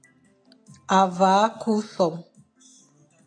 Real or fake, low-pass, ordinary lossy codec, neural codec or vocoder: real; 9.9 kHz; AAC, 64 kbps; none